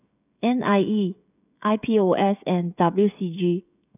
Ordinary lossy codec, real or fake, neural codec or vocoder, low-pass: none; fake; codec, 16 kHz, 16 kbps, FreqCodec, smaller model; 3.6 kHz